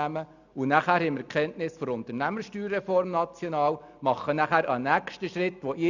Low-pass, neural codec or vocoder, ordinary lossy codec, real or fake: 7.2 kHz; none; none; real